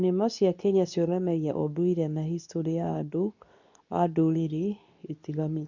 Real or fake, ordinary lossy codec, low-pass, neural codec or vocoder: fake; none; 7.2 kHz; codec, 24 kHz, 0.9 kbps, WavTokenizer, medium speech release version 2